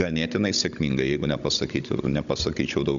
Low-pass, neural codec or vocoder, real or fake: 7.2 kHz; codec, 16 kHz, 16 kbps, FunCodec, trained on Chinese and English, 50 frames a second; fake